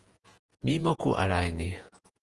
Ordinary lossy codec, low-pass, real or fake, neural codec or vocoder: Opus, 24 kbps; 10.8 kHz; fake; vocoder, 48 kHz, 128 mel bands, Vocos